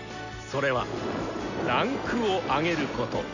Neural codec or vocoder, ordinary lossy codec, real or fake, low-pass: none; none; real; 7.2 kHz